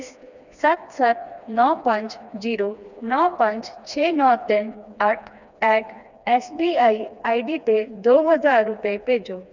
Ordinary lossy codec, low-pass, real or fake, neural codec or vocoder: none; 7.2 kHz; fake; codec, 16 kHz, 2 kbps, FreqCodec, smaller model